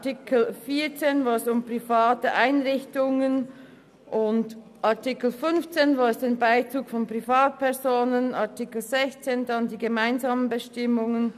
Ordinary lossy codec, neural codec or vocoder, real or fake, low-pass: none; none; real; 14.4 kHz